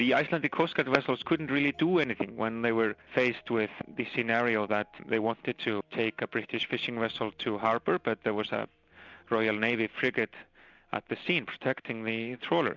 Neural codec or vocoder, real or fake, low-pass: none; real; 7.2 kHz